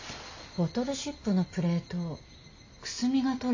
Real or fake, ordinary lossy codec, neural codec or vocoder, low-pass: real; AAC, 48 kbps; none; 7.2 kHz